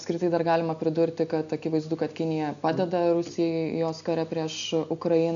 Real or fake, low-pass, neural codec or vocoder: real; 7.2 kHz; none